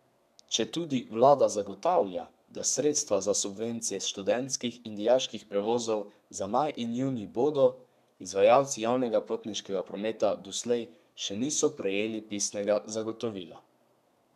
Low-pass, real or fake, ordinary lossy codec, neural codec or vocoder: 14.4 kHz; fake; none; codec, 32 kHz, 1.9 kbps, SNAC